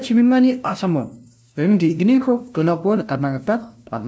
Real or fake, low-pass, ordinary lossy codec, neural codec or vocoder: fake; none; none; codec, 16 kHz, 0.5 kbps, FunCodec, trained on LibriTTS, 25 frames a second